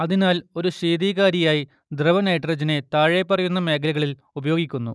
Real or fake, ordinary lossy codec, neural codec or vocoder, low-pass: real; none; none; none